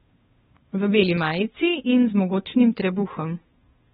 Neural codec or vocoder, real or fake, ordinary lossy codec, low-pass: codec, 16 kHz, 2 kbps, FunCodec, trained on Chinese and English, 25 frames a second; fake; AAC, 16 kbps; 7.2 kHz